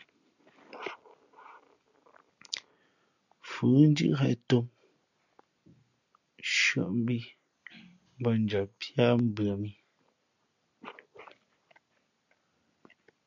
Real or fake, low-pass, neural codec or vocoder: real; 7.2 kHz; none